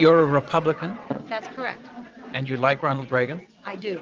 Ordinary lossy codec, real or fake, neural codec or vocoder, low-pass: Opus, 24 kbps; fake; vocoder, 22.05 kHz, 80 mel bands, WaveNeXt; 7.2 kHz